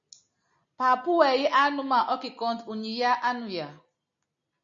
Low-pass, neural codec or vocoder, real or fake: 7.2 kHz; none; real